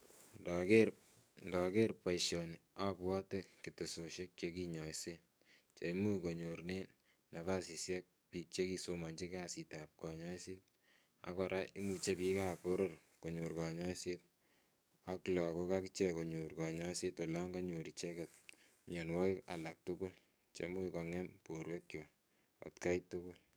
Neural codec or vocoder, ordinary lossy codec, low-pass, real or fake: codec, 44.1 kHz, 7.8 kbps, DAC; none; none; fake